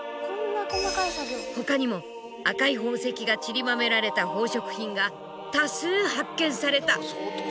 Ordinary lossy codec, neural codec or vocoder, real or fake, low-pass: none; none; real; none